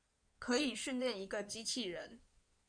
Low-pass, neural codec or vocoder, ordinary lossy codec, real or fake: 9.9 kHz; codec, 16 kHz in and 24 kHz out, 2.2 kbps, FireRedTTS-2 codec; MP3, 96 kbps; fake